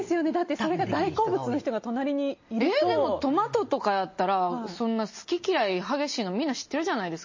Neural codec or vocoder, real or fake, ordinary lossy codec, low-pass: none; real; MP3, 32 kbps; 7.2 kHz